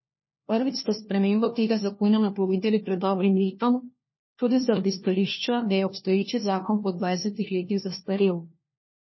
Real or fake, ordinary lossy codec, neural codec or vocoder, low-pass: fake; MP3, 24 kbps; codec, 16 kHz, 1 kbps, FunCodec, trained on LibriTTS, 50 frames a second; 7.2 kHz